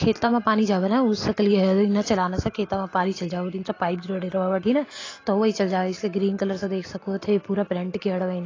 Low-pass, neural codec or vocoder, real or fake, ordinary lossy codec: 7.2 kHz; none; real; AAC, 32 kbps